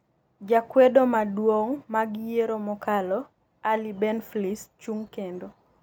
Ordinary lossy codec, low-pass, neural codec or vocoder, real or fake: none; none; none; real